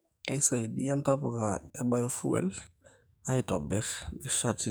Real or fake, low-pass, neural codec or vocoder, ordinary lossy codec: fake; none; codec, 44.1 kHz, 2.6 kbps, SNAC; none